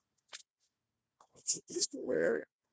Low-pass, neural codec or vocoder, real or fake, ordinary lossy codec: none; codec, 16 kHz, 1 kbps, FunCodec, trained on Chinese and English, 50 frames a second; fake; none